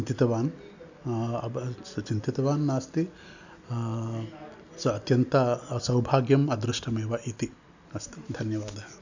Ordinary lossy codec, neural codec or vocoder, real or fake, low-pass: none; none; real; 7.2 kHz